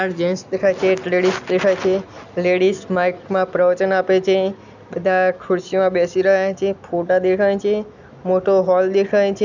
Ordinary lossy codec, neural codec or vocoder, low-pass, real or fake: none; none; 7.2 kHz; real